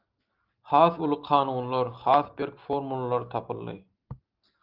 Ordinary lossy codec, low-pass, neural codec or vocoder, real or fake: Opus, 24 kbps; 5.4 kHz; none; real